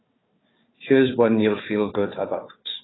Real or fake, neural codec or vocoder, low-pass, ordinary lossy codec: fake; codec, 16 kHz, 4 kbps, FunCodec, trained on Chinese and English, 50 frames a second; 7.2 kHz; AAC, 16 kbps